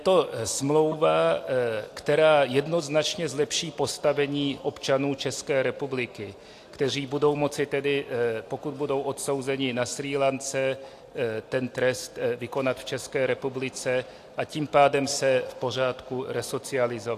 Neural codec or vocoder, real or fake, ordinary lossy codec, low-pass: none; real; AAC, 64 kbps; 14.4 kHz